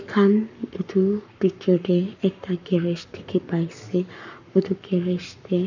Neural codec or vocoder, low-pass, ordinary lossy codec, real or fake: codec, 44.1 kHz, 7.8 kbps, Pupu-Codec; 7.2 kHz; none; fake